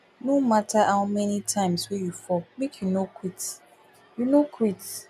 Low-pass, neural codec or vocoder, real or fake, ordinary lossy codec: 14.4 kHz; none; real; none